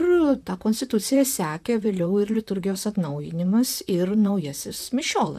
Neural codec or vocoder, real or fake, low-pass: vocoder, 44.1 kHz, 128 mel bands, Pupu-Vocoder; fake; 14.4 kHz